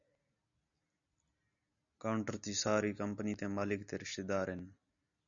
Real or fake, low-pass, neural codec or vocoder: real; 7.2 kHz; none